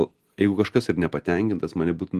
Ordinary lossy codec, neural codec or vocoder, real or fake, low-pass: Opus, 24 kbps; none; real; 14.4 kHz